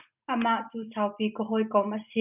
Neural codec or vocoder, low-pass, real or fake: none; 3.6 kHz; real